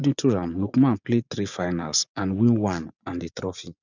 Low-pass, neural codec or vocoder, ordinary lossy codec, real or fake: 7.2 kHz; vocoder, 24 kHz, 100 mel bands, Vocos; none; fake